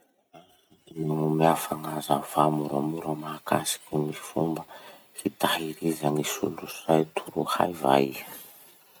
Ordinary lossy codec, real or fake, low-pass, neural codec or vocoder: none; real; none; none